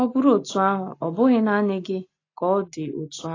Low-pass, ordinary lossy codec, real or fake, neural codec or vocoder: 7.2 kHz; AAC, 32 kbps; real; none